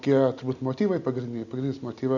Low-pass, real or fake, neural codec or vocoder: 7.2 kHz; real; none